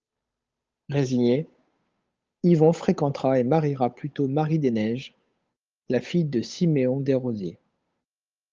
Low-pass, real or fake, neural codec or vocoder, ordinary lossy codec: 7.2 kHz; fake; codec, 16 kHz, 8 kbps, FunCodec, trained on Chinese and English, 25 frames a second; Opus, 24 kbps